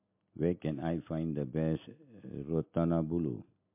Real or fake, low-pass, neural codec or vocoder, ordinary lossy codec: real; 3.6 kHz; none; MP3, 32 kbps